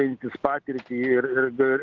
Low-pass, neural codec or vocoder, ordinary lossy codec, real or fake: 7.2 kHz; none; Opus, 16 kbps; real